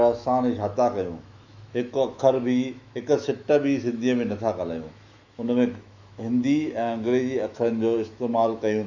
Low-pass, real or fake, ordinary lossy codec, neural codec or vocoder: 7.2 kHz; fake; Opus, 64 kbps; autoencoder, 48 kHz, 128 numbers a frame, DAC-VAE, trained on Japanese speech